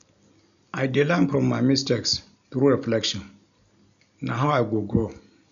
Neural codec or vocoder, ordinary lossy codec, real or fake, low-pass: none; none; real; 7.2 kHz